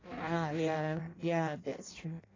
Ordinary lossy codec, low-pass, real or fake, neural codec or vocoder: AAC, 32 kbps; 7.2 kHz; fake; codec, 16 kHz in and 24 kHz out, 0.6 kbps, FireRedTTS-2 codec